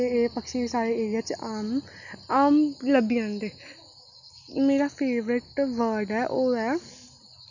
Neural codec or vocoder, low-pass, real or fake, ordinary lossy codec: none; 7.2 kHz; real; none